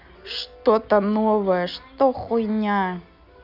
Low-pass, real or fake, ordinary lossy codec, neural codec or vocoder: 5.4 kHz; real; none; none